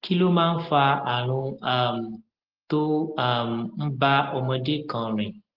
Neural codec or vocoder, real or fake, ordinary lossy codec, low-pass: none; real; Opus, 16 kbps; 5.4 kHz